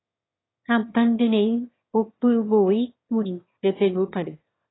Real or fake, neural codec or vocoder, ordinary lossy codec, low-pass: fake; autoencoder, 22.05 kHz, a latent of 192 numbers a frame, VITS, trained on one speaker; AAC, 16 kbps; 7.2 kHz